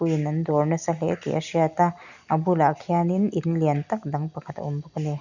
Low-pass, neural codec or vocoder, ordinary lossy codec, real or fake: 7.2 kHz; none; none; real